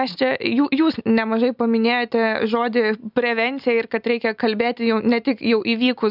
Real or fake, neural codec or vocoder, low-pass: real; none; 5.4 kHz